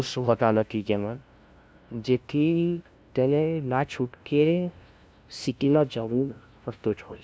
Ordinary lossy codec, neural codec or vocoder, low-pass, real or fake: none; codec, 16 kHz, 0.5 kbps, FunCodec, trained on LibriTTS, 25 frames a second; none; fake